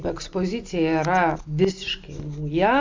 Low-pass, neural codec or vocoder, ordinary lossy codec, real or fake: 7.2 kHz; none; MP3, 64 kbps; real